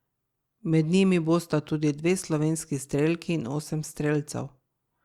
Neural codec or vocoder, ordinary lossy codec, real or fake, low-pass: none; Opus, 64 kbps; real; 19.8 kHz